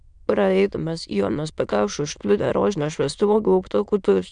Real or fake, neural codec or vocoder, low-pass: fake; autoencoder, 22.05 kHz, a latent of 192 numbers a frame, VITS, trained on many speakers; 9.9 kHz